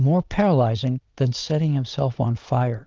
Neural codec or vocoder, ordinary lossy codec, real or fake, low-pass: vocoder, 22.05 kHz, 80 mel bands, Vocos; Opus, 32 kbps; fake; 7.2 kHz